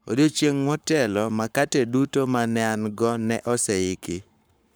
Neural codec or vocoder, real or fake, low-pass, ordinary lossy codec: codec, 44.1 kHz, 7.8 kbps, Pupu-Codec; fake; none; none